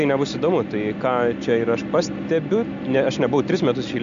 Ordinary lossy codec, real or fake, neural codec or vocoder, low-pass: MP3, 64 kbps; real; none; 7.2 kHz